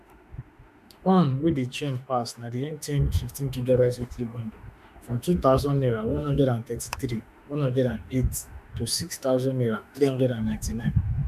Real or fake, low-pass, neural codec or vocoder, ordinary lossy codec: fake; 14.4 kHz; autoencoder, 48 kHz, 32 numbers a frame, DAC-VAE, trained on Japanese speech; none